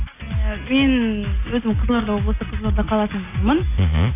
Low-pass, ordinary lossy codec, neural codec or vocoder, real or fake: 3.6 kHz; AAC, 24 kbps; none; real